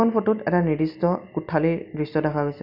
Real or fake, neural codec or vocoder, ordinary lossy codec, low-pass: real; none; none; 5.4 kHz